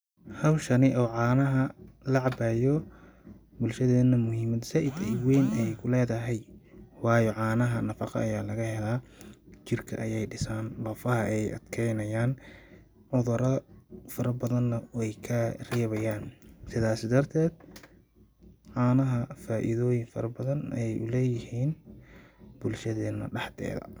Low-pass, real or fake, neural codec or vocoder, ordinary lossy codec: none; real; none; none